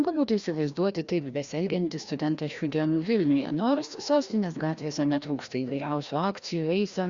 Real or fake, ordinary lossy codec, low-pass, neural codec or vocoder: fake; Opus, 64 kbps; 7.2 kHz; codec, 16 kHz, 1 kbps, FreqCodec, larger model